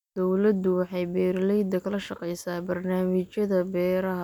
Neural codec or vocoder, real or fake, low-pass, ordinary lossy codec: none; real; 19.8 kHz; none